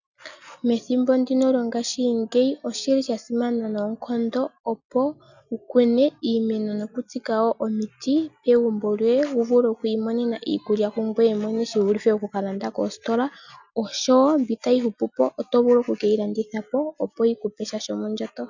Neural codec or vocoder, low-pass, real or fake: none; 7.2 kHz; real